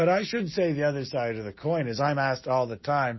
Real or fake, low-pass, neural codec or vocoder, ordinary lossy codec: real; 7.2 kHz; none; MP3, 24 kbps